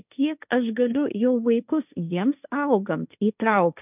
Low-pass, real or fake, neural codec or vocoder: 3.6 kHz; fake; codec, 16 kHz, 1.1 kbps, Voila-Tokenizer